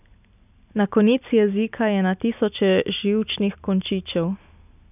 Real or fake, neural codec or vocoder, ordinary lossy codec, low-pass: real; none; none; 3.6 kHz